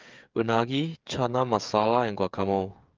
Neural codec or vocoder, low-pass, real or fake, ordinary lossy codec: codec, 16 kHz, 8 kbps, FreqCodec, smaller model; 7.2 kHz; fake; Opus, 24 kbps